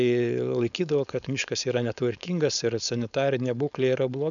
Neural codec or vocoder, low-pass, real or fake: codec, 16 kHz, 4.8 kbps, FACodec; 7.2 kHz; fake